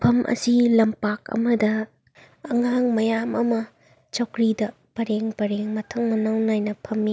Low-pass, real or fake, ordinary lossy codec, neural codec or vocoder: none; real; none; none